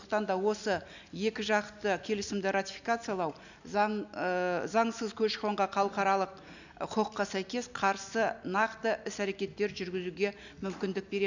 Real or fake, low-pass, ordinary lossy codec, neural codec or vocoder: real; 7.2 kHz; none; none